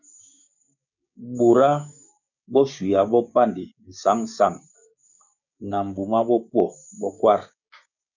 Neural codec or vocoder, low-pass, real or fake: codec, 16 kHz, 6 kbps, DAC; 7.2 kHz; fake